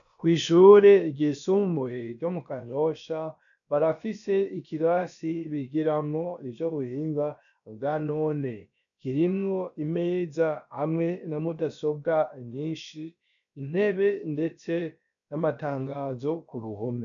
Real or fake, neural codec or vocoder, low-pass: fake; codec, 16 kHz, about 1 kbps, DyCAST, with the encoder's durations; 7.2 kHz